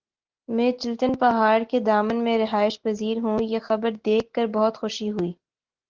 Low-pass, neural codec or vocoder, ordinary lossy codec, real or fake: 7.2 kHz; none; Opus, 16 kbps; real